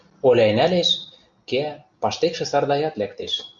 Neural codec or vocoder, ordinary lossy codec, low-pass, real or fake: none; Opus, 64 kbps; 7.2 kHz; real